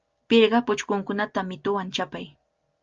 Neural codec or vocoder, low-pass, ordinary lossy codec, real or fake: none; 7.2 kHz; Opus, 24 kbps; real